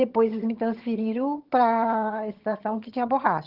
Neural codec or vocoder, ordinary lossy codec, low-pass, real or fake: vocoder, 22.05 kHz, 80 mel bands, HiFi-GAN; Opus, 16 kbps; 5.4 kHz; fake